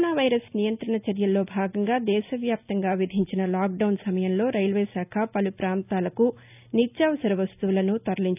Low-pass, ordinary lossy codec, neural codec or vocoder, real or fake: 3.6 kHz; none; none; real